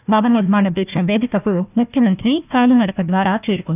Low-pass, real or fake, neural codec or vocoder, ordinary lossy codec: 3.6 kHz; fake; codec, 16 kHz, 1 kbps, FunCodec, trained on Chinese and English, 50 frames a second; none